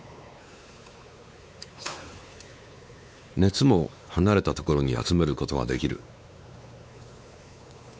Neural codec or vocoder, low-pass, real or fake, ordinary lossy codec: codec, 16 kHz, 4 kbps, X-Codec, WavLM features, trained on Multilingual LibriSpeech; none; fake; none